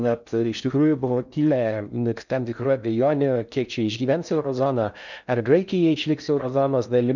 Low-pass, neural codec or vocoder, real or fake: 7.2 kHz; codec, 16 kHz in and 24 kHz out, 0.6 kbps, FocalCodec, streaming, 4096 codes; fake